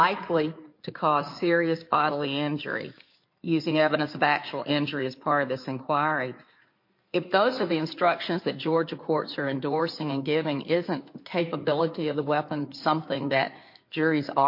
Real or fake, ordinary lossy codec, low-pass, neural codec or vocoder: fake; MP3, 32 kbps; 5.4 kHz; codec, 16 kHz in and 24 kHz out, 2.2 kbps, FireRedTTS-2 codec